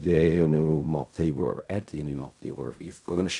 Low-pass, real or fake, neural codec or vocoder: 10.8 kHz; fake; codec, 16 kHz in and 24 kHz out, 0.4 kbps, LongCat-Audio-Codec, fine tuned four codebook decoder